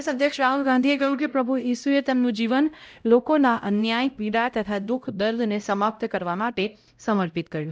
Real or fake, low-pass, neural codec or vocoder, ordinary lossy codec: fake; none; codec, 16 kHz, 0.5 kbps, X-Codec, HuBERT features, trained on LibriSpeech; none